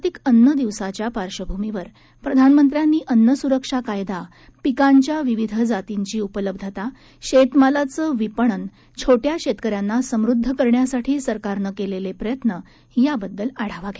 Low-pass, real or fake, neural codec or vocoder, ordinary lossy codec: none; real; none; none